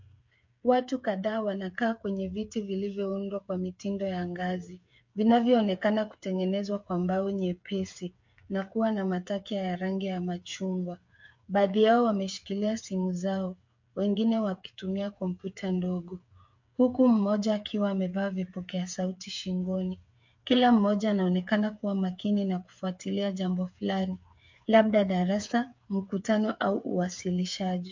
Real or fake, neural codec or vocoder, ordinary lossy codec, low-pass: fake; codec, 16 kHz, 8 kbps, FreqCodec, smaller model; MP3, 48 kbps; 7.2 kHz